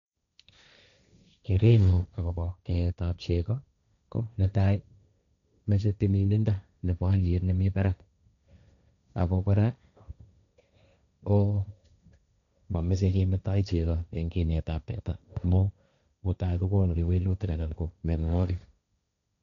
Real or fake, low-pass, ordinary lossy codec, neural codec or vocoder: fake; 7.2 kHz; none; codec, 16 kHz, 1.1 kbps, Voila-Tokenizer